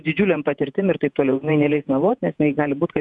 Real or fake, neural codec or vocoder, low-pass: fake; vocoder, 48 kHz, 128 mel bands, Vocos; 10.8 kHz